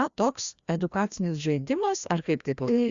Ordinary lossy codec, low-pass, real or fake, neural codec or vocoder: Opus, 64 kbps; 7.2 kHz; fake; codec, 16 kHz, 1 kbps, FreqCodec, larger model